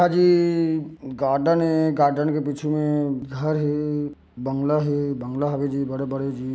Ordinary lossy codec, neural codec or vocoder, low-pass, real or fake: none; none; none; real